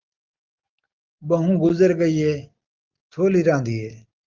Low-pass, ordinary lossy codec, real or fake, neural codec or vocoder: 7.2 kHz; Opus, 32 kbps; real; none